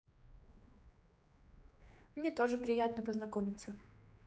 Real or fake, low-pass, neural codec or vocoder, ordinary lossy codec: fake; none; codec, 16 kHz, 2 kbps, X-Codec, HuBERT features, trained on general audio; none